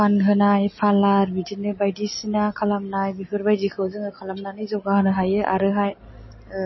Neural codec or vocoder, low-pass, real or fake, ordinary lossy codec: none; 7.2 kHz; real; MP3, 24 kbps